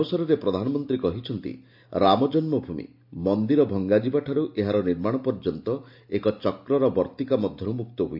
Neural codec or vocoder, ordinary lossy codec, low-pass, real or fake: none; MP3, 48 kbps; 5.4 kHz; real